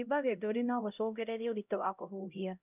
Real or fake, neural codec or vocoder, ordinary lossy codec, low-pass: fake; codec, 16 kHz, 0.5 kbps, X-Codec, HuBERT features, trained on LibriSpeech; none; 3.6 kHz